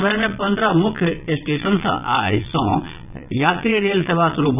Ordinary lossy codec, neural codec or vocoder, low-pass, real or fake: none; vocoder, 22.05 kHz, 80 mel bands, Vocos; 3.6 kHz; fake